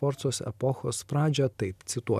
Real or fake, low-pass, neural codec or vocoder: real; 14.4 kHz; none